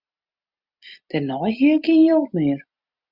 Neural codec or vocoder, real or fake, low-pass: none; real; 5.4 kHz